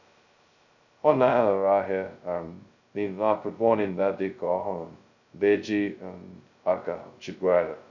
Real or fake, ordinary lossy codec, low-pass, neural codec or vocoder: fake; none; 7.2 kHz; codec, 16 kHz, 0.2 kbps, FocalCodec